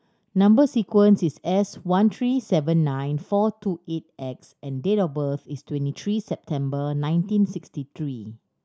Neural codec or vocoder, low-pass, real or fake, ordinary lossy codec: none; none; real; none